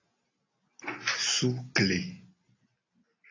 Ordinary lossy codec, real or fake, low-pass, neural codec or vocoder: AAC, 32 kbps; real; 7.2 kHz; none